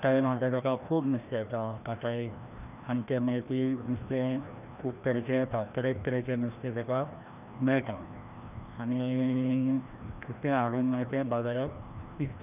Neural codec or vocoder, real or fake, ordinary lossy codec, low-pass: codec, 16 kHz, 1 kbps, FreqCodec, larger model; fake; none; 3.6 kHz